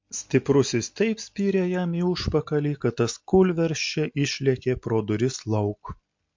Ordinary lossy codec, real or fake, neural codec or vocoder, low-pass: MP3, 48 kbps; real; none; 7.2 kHz